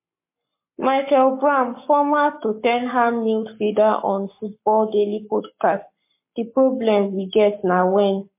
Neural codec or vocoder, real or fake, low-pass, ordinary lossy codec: codec, 44.1 kHz, 7.8 kbps, Pupu-Codec; fake; 3.6 kHz; MP3, 24 kbps